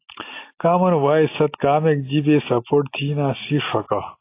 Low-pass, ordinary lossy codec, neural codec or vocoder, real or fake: 3.6 kHz; AAC, 24 kbps; none; real